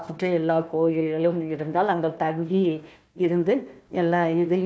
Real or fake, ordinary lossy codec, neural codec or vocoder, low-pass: fake; none; codec, 16 kHz, 1 kbps, FunCodec, trained on Chinese and English, 50 frames a second; none